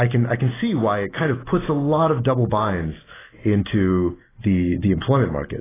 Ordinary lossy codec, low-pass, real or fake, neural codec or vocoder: AAC, 16 kbps; 3.6 kHz; real; none